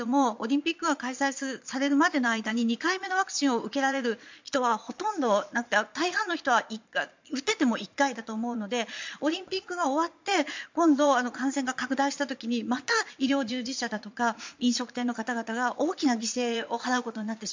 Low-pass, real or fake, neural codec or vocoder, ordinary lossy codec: 7.2 kHz; fake; vocoder, 22.05 kHz, 80 mel bands, Vocos; MP3, 64 kbps